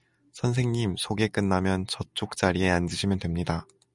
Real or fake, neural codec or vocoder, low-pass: real; none; 10.8 kHz